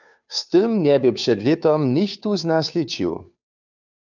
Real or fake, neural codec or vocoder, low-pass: fake; codec, 16 kHz, 2 kbps, FunCodec, trained on Chinese and English, 25 frames a second; 7.2 kHz